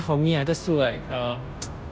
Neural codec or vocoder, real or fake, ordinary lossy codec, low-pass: codec, 16 kHz, 0.5 kbps, FunCodec, trained on Chinese and English, 25 frames a second; fake; none; none